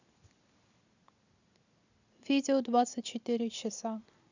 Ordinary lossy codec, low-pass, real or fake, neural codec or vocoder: none; 7.2 kHz; real; none